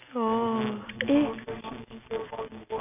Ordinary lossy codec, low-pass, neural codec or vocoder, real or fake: AAC, 32 kbps; 3.6 kHz; none; real